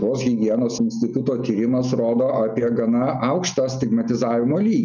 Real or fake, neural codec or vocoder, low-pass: real; none; 7.2 kHz